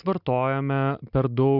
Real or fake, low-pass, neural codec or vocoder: real; 5.4 kHz; none